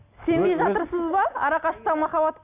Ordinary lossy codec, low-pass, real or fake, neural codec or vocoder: none; 3.6 kHz; real; none